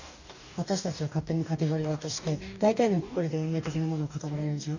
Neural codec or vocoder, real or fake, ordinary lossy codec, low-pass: codec, 44.1 kHz, 2.6 kbps, DAC; fake; none; 7.2 kHz